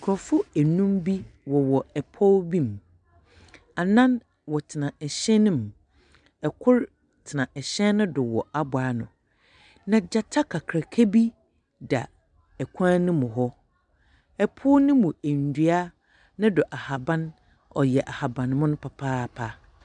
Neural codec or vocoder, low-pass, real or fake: none; 9.9 kHz; real